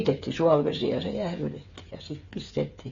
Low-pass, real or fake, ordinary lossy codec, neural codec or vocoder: 7.2 kHz; fake; AAC, 32 kbps; codec, 16 kHz, 8 kbps, FreqCodec, smaller model